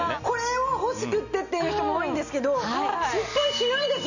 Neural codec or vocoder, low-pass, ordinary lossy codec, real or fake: none; 7.2 kHz; none; real